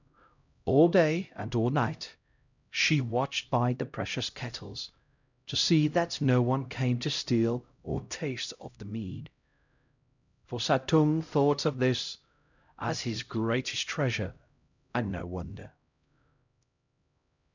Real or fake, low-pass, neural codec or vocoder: fake; 7.2 kHz; codec, 16 kHz, 0.5 kbps, X-Codec, HuBERT features, trained on LibriSpeech